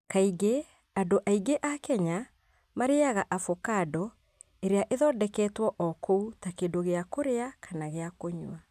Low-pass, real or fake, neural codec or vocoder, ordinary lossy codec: 14.4 kHz; real; none; none